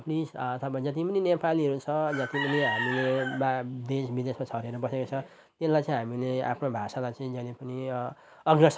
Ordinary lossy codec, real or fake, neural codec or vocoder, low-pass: none; real; none; none